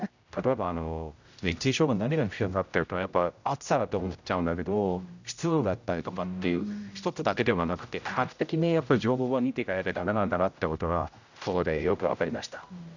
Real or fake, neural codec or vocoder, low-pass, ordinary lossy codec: fake; codec, 16 kHz, 0.5 kbps, X-Codec, HuBERT features, trained on general audio; 7.2 kHz; none